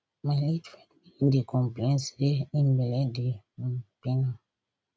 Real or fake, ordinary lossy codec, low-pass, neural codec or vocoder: real; none; none; none